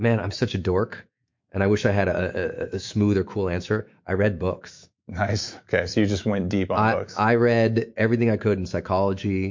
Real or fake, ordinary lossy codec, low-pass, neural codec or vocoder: fake; MP3, 48 kbps; 7.2 kHz; autoencoder, 48 kHz, 128 numbers a frame, DAC-VAE, trained on Japanese speech